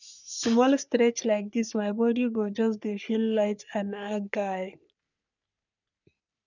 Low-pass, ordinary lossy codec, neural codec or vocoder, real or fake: 7.2 kHz; none; codec, 44.1 kHz, 3.4 kbps, Pupu-Codec; fake